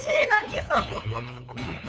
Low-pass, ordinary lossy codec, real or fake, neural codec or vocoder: none; none; fake; codec, 16 kHz, 2 kbps, FunCodec, trained on LibriTTS, 25 frames a second